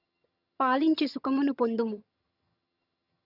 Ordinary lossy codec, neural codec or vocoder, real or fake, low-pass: none; vocoder, 22.05 kHz, 80 mel bands, HiFi-GAN; fake; 5.4 kHz